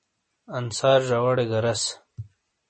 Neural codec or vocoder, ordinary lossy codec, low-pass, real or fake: none; MP3, 32 kbps; 9.9 kHz; real